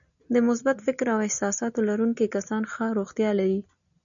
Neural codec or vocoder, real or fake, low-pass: none; real; 7.2 kHz